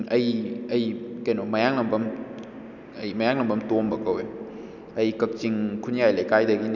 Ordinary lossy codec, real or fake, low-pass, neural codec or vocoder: none; real; 7.2 kHz; none